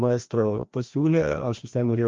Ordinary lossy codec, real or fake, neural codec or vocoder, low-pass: Opus, 32 kbps; fake; codec, 16 kHz, 1 kbps, FreqCodec, larger model; 7.2 kHz